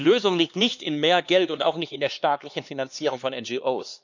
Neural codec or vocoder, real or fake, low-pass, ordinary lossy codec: codec, 16 kHz, 2 kbps, X-Codec, HuBERT features, trained on LibriSpeech; fake; 7.2 kHz; none